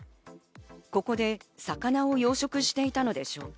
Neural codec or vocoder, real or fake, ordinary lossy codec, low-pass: none; real; none; none